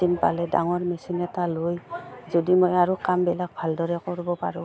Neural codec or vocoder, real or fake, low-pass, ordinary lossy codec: none; real; none; none